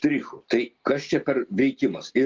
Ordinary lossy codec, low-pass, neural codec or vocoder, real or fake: Opus, 32 kbps; 7.2 kHz; none; real